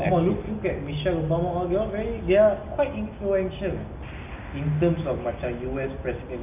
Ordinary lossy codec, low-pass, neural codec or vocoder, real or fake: none; 3.6 kHz; none; real